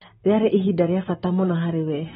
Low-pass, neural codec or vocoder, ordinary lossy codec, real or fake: 19.8 kHz; none; AAC, 16 kbps; real